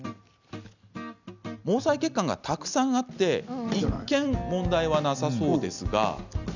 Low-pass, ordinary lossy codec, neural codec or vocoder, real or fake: 7.2 kHz; none; none; real